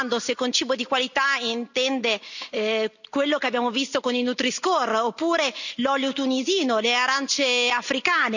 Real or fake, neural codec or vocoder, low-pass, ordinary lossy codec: real; none; 7.2 kHz; none